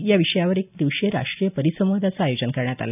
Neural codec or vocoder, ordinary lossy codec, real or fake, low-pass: none; none; real; 3.6 kHz